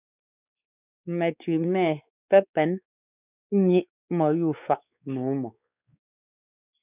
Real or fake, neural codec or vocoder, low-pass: fake; codec, 16 kHz, 4 kbps, X-Codec, WavLM features, trained on Multilingual LibriSpeech; 3.6 kHz